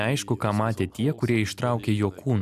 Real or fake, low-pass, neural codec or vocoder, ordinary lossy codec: real; 14.4 kHz; none; AAC, 96 kbps